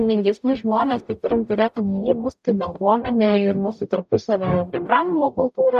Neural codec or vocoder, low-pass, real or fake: codec, 44.1 kHz, 0.9 kbps, DAC; 14.4 kHz; fake